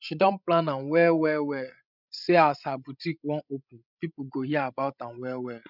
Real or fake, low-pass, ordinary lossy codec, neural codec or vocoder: fake; 5.4 kHz; none; codec, 16 kHz, 16 kbps, FreqCodec, larger model